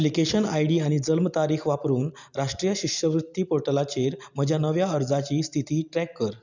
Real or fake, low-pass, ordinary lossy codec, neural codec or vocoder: real; 7.2 kHz; none; none